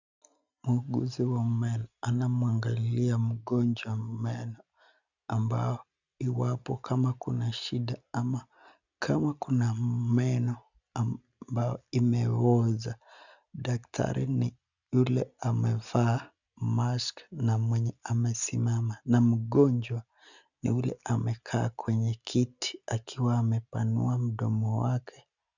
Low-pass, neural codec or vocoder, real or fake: 7.2 kHz; none; real